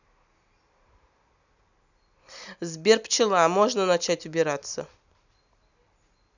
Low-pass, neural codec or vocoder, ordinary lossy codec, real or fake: 7.2 kHz; none; none; real